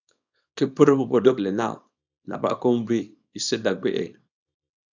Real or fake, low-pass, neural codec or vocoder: fake; 7.2 kHz; codec, 24 kHz, 0.9 kbps, WavTokenizer, small release